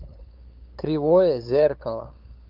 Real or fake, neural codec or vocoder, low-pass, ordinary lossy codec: fake; codec, 16 kHz, 8 kbps, FunCodec, trained on LibriTTS, 25 frames a second; 5.4 kHz; Opus, 24 kbps